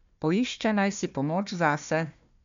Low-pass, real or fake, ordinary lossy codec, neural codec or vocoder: 7.2 kHz; fake; MP3, 64 kbps; codec, 16 kHz, 1 kbps, FunCodec, trained on Chinese and English, 50 frames a second